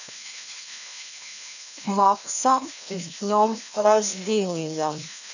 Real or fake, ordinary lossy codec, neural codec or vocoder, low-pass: fake; none; codec, 16 kHz, 1 kbps, FreqCodec, larger model; 7.2 kHz